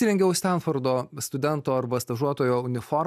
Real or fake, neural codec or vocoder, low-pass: real; none; 14.4 kHz